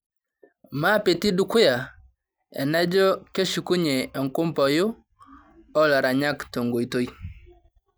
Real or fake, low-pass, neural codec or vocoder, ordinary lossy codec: real; none; none; none